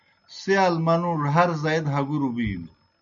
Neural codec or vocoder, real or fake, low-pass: none; real; 7.2 kHz